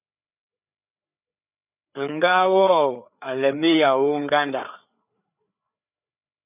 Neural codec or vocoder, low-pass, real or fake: codec, 16 kHz, 4 kbps, FreqCodec, larger model; 3.6 kHz; fake